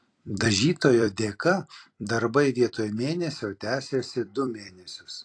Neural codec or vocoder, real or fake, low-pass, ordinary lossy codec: vocoder, 44.1 kHz, 128 mel bands every 256 samples, BigVGAN v2; fake; 9.9 kHz; AAC, 48 kbps